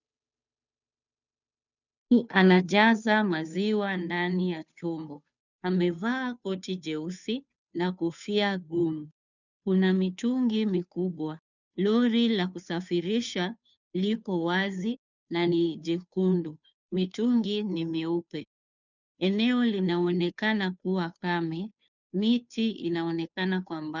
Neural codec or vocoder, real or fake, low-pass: codec, 16 kHz, 2 kbps, FunCodec, trained on Chinese and English, 25 frames a second; fake; 7.2 kHz